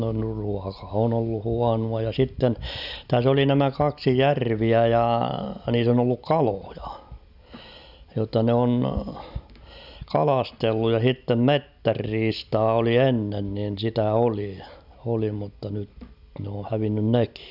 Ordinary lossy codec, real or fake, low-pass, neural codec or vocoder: none; real; 5.4 kHz; none